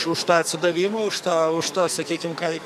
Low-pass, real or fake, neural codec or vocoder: 14.4 kHz; fake; codec, 44.1 kHz, 2.6 kbps, SNAC